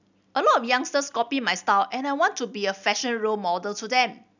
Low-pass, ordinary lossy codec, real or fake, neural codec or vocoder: 7.2 kHz; none; real; none